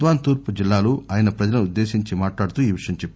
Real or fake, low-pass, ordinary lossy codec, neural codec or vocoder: real; none; none; none